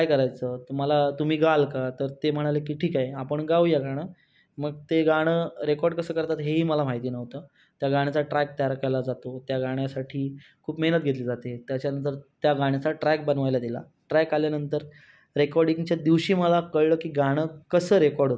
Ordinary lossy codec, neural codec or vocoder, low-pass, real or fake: none; none; none; real